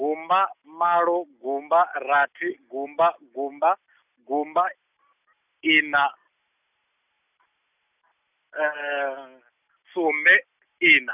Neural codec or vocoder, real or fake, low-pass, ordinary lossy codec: none; real; 3.6 kHz; none